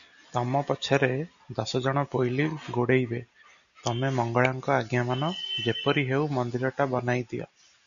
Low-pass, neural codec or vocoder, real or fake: 7.2 kHz; none; real